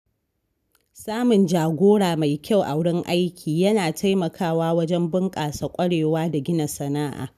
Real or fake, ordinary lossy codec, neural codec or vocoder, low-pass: real; none; none; 14.4 kHz